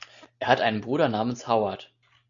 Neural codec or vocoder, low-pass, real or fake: none; 7.2 kHz; real